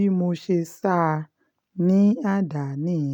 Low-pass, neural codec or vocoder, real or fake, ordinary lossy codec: 19.8 kHz; none; real; none